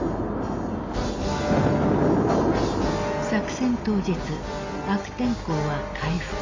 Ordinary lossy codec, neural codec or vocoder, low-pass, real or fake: AAC, 32 kbps; none; 7.2 kHz; real